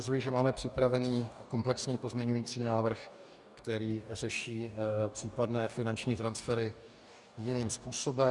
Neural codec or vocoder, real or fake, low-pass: codec, 44.1 kHz, 2.6 kbps, DAC; fake; 10.8 kHz